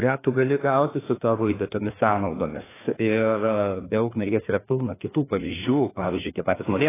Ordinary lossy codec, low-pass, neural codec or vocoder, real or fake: AAC, 16 kbps; 3.6 kHz; codec, 16 kHz, 2 kbps, FreqCodec, larger model; fake